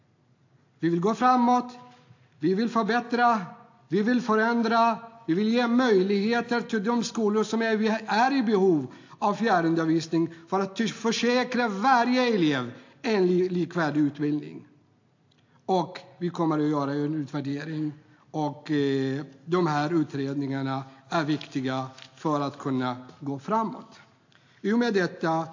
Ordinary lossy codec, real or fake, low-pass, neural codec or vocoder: AAC, 48 kbps; real; 7.2 kHz; none